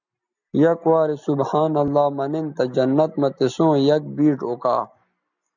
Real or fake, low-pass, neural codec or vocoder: real; 7.2 kHz; none